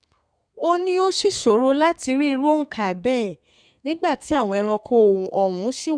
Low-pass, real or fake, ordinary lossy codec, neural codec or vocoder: 9.9 kHz; fake; none; codec, 32 kHz, 1.9 kbps, SNAC